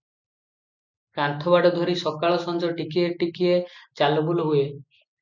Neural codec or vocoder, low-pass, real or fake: none; 7.2 kHz; real